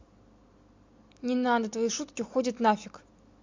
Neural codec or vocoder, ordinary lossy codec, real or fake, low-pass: none; MP3, 48 kbps; real; 7.2 kHz